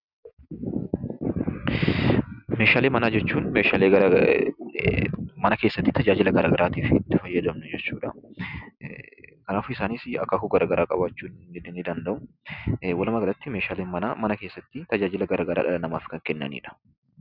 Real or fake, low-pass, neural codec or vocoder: real; 5.4 kHz; none